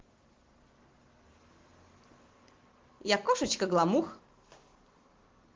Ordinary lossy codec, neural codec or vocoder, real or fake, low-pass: Opus, 16 kbps; none; real; 7.2 kHz